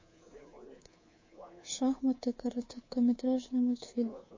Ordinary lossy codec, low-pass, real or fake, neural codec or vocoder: MP3, 32 kbps; 7.2 kHz; fake; codec, 24 kHz, 3.1 kbps, DualCodec